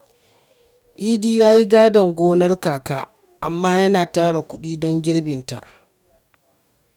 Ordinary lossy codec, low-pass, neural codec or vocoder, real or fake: none; 19.8 kHz; codec, 44.1 kHz, 2.6 kbps, DAC; fake